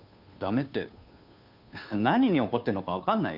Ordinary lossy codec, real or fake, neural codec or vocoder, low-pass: none; fake; codec, 16 kHz, 2 kbps, FunCodec, trained on Chinese and English, 25 frames a second; 5.4 kHz